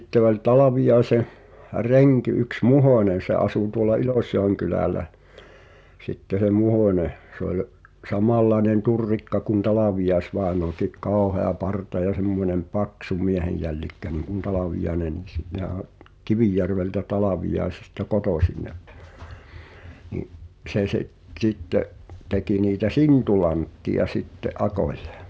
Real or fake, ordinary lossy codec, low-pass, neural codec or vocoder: real; none; none; none